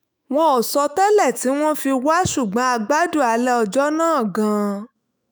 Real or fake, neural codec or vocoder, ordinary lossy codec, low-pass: fake; autoencoder, 48 kHz, 128 numbers a frame, DAC-VAE, trained on Japanese speech; none; none